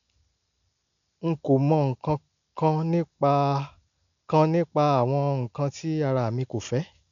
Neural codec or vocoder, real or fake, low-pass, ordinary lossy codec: none; real; 7.2 kHz; none